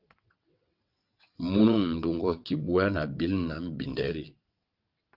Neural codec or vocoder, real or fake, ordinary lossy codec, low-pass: vocoder, 22.05 kHz, 80 mel bands, WaveNeXt; fake; Opus, 32 kbps; 5.4 kHz